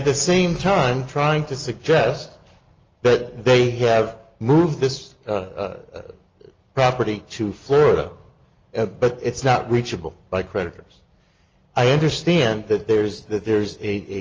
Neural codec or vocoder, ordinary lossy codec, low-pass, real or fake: none; Opus, 24 kbps; 7.2 kHz; real